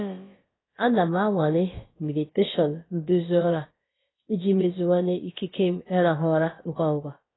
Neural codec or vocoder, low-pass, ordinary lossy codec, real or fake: codec, 16 kHz, about 1 kbps, DyCAST, with the encoder's durations; 7.2 kHz; AAC, 16 kbps; fake